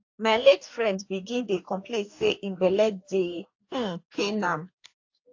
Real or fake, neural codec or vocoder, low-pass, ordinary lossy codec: fake; codec, 44.1 kHz, 2.6 kbps, DAC; 7.2 kHz; AAC, 48 kbps